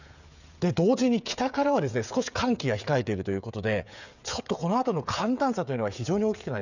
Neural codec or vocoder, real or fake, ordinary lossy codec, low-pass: codec, 16 kHz, 16 kbps, FreqCodec, smaller model; fake; none; 7.2 kHz